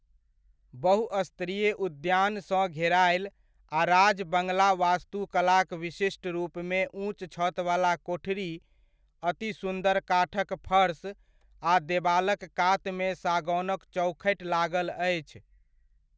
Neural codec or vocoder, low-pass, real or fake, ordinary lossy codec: none; none; real; none